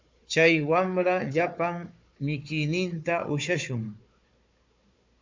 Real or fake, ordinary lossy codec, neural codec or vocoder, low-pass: fake; MP3, 64 kbps; codec, 16 kHz, 4 kbps, FunCodec, trained on Chinese and English, 50 frames a second; 7.2 kHz